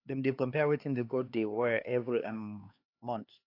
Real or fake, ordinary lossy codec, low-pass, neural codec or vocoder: fake; AAC, 32 kbps; 5.4 kHz; codec, 16 kHz, 2 kbps, X-Codec, HuBERT features, trained on LibriSpeech